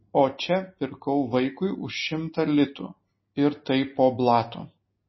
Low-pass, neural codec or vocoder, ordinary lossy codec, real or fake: 7.2 kHz; none; MP3, 24 kbps; real